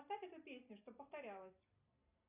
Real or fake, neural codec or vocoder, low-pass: real; none; 3.6 kHz